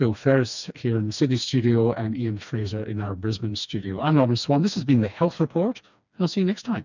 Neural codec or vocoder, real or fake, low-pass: codec, 16 kHz, 2 kbps, FreqCodec, smaller model; fake; 7.2 kHz